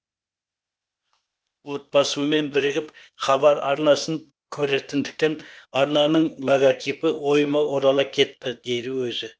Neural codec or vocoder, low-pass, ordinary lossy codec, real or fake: codec, 16 kHz, 0.8 kbps, ZipCodec; none; none; fake